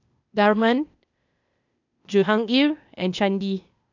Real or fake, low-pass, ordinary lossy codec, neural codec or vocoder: fake; 7.2 kHz; none; codec, 16 kHz, 0.8 kbps, ZipCodec